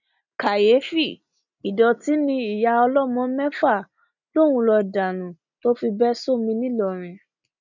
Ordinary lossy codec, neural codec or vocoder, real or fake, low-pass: none; none; real; 7.2 kHz